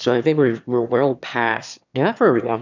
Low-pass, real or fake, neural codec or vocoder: 7.2 kHz; fake; autoencoder, 22.05 kHz, a latent of 192 numbers a frame, VITS, trained on one speaker